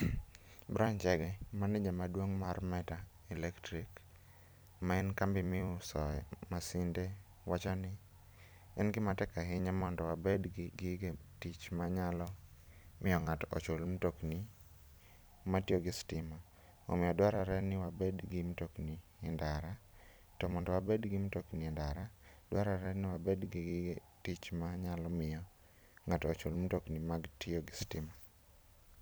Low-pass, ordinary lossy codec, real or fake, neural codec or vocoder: none; none; fake; vocoder, 44.1 kHz, 128 mel bands every 256 samples, BigVGAN v2